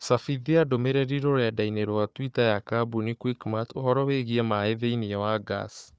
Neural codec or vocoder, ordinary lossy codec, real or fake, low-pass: codec, 16 kHz, 4 kbps, FunCodec, trained on Chinese and English, 50 frames a second; none; fake; none